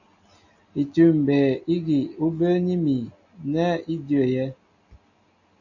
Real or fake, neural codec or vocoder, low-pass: real; none; 7.2 kHz